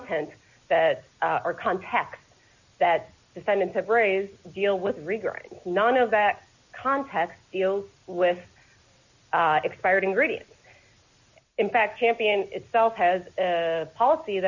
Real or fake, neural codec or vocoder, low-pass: real; none; 7.2 kHz